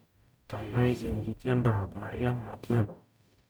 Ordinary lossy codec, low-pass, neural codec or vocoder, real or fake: none; none; codec, 44.1 kHz, 0.9 kbps, DAC; fake